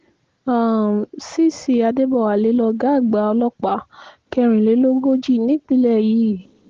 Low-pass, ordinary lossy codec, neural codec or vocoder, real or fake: 7.2 kHz; Opus, 16 kbps; none; real